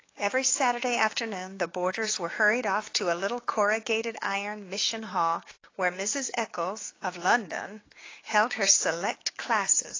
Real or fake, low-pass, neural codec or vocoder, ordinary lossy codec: fake; 7.2 kHz; codec, 16 kHz, 6 kbps, DAC; AAC, 32 kbps